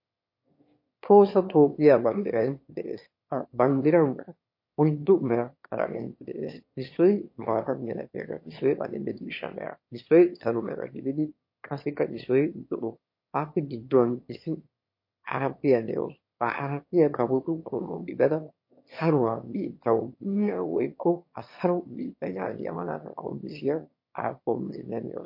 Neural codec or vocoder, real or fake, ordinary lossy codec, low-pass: autoencoder, 22.05 kHz, a latent of 192 numbers a frame, VITS, trained on one speaker; fake; MP3, 32 kbps; 5.4 kHz